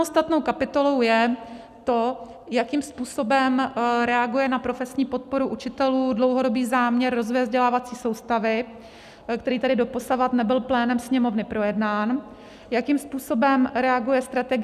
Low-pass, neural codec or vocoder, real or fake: 14.4 kHz; none; real